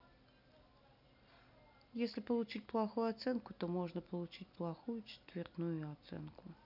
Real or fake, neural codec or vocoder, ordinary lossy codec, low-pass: real; none; none; 5.4 kHz